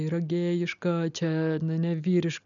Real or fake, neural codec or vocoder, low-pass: real; none; 7.2 kHz